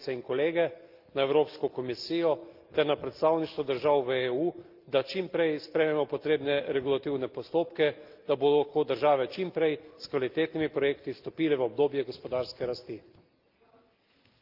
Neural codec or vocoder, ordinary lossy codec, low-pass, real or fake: none; Opus, 24 kbps; 5.4 kHz; real